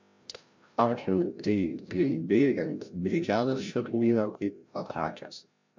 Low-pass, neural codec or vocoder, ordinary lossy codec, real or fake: 7.2 kHz; codec, 16 kHz, 0.5 kbps, FreqCodec, larger model; MP3, 64 kbps; fake